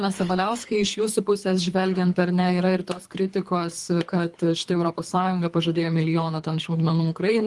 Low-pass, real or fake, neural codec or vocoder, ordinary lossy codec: 10.8 kHz; fake; codec, 24 kHz, 3 kbps, HILCodec; Opus, 24 kbps